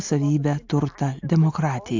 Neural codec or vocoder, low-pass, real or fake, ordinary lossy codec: none; 7.2 kHz; real; AAC, 48 kbps